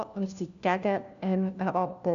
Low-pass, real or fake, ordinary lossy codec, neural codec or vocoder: 7.2 kHz; fake; none; codec, 16 kHz, 1 kbps, FunCodec, trained on LibriTTS, 50 frames a second